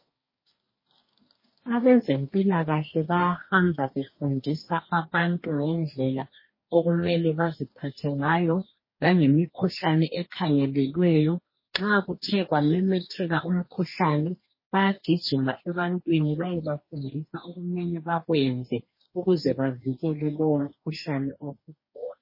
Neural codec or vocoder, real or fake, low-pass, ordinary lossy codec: codec, 44.1 kHz, 2.6 kbps, DAC; fake; 5.4 kHz; MP3, 24 kbps